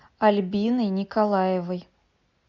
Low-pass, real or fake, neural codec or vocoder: 7.2 kHz; real; none